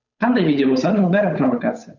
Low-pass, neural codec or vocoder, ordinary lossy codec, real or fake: 7.2 kHz; codec, 16 kHz, 8 kbps, FunCodec, trained on Chinese and English, 25 frames a second; none; fake